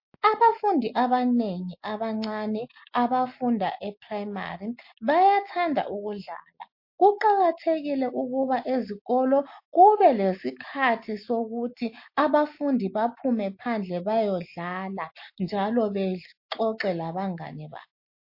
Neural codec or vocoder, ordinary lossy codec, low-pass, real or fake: none; MP3, 32 kbps; 5.4 kHz; real